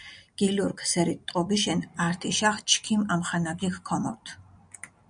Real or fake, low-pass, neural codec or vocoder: real; 9.9 kHz; none